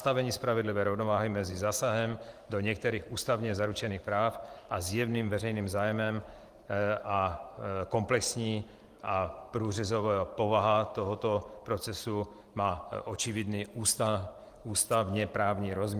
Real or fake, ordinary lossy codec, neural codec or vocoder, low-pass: fake; Opus, 24 kbps; vocoder, 44.1 kHz, 128 mel bands every 512 samples, BigVGAN v2; 14.4 kHz